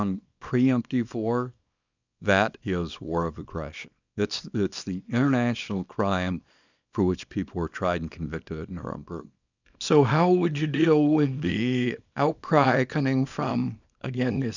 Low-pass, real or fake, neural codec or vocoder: 7.2 kHz; fake; codec, 24 kHz, 0.9 kbps, WavTokenizer, small release